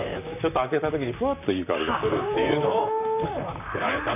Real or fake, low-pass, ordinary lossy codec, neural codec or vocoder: fake; 3.6 kHz; none; vocoder, 44.1 kHz, 128 mel bands, Pupu-Vocoder